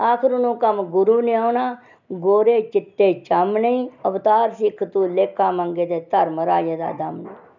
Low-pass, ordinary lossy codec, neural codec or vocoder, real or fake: 7.2 kHz; none; none; real